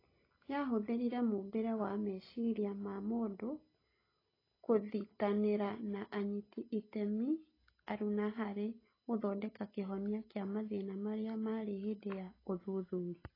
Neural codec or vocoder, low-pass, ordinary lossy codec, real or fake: none; 5.4 kHz; AAC, 24 kbps; real